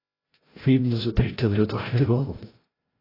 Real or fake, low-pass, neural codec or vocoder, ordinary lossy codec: fake; 5.4 kHz; codec, 16 kHz, 0.5 kbps, FreqCodec, larger model; AAC, 24 kbps